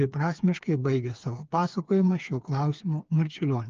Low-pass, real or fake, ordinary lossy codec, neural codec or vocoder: 7.2 kHz; fake; Opus, 24 kbps; codec, 16 kHz, 4 kbps, FreqCodec, smaller model